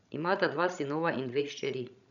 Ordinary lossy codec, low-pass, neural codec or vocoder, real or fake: none; 7.2 kHz; codec, 16 kHz, 16 kbps, FreqCodec, larger model; fake